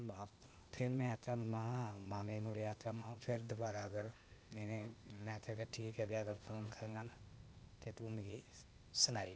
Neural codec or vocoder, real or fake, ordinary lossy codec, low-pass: codec, 16 kHz, 0.8 kbps, ZipCodec; fake; none; none